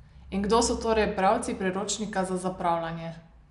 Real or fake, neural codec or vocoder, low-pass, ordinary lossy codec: real; none; 10.8 kHz; none